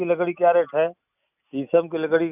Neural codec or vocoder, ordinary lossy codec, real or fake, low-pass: none; none; real; 3.6 kHz